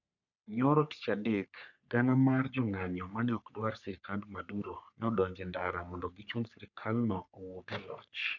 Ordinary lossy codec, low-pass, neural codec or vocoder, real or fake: none; 7.2 kHz; codec, 44.1 kHz, 3.4 kbps, Pupu-Codec; fake